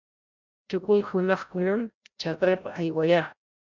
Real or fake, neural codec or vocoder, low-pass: fake; codec, 16 kHz, 0.5 kbps, FreqCodec, larger model; 7.2 kHz